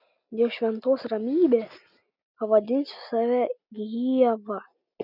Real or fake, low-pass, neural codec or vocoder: real; 5.4 kHz; none